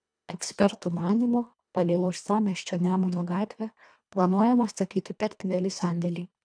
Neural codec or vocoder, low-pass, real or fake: codec, 24 kHz, 1.5 kbps, HILCodec; 9.9 kHz; fake